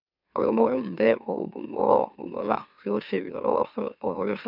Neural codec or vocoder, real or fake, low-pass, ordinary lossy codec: autoencoder, 44.1 kHz, a latent of 192 numbers a frame, MeloTTS; fake; 5.4 kHz; none